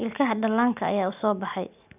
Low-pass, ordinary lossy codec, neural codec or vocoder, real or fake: 3.6 kHz; none; none; real